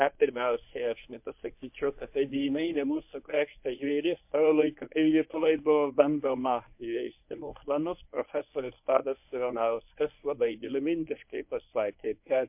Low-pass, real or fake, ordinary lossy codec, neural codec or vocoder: 3.6 kHz; fake; MP3, 32 kbps; codec, 24 kHz, 0.9 kbps, WavTokenizer, medium speech release version 1